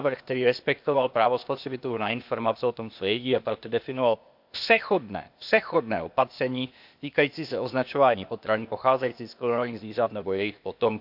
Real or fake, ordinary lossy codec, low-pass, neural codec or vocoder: fake; none; 5.4 kHz; codec, 16 kHz, 0.8 kbps, ZipCodec